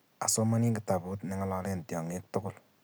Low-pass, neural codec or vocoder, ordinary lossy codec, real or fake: none; none; none; real